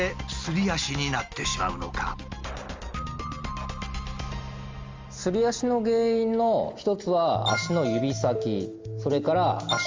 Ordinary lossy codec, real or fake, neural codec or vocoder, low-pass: Opus, 32 kbps; real; none; 7.2 kHz